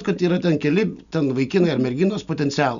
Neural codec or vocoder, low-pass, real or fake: none; 7.2 kHz; real